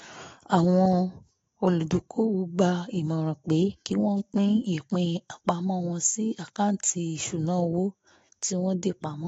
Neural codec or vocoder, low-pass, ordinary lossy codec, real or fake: autoencoder, 48 kHz, 128 numbers a frame, DAC-VAE, trained on Japanese speech; 19.8 kHz; AAC, 24 kbps; fake